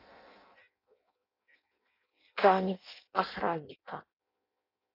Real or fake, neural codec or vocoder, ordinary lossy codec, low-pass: fake; codec, 16 kHz in and 24 kHz out, 0.6 kbps, FireRedTTS-2 codec; AAC, 32 kbps; 5.4 kHz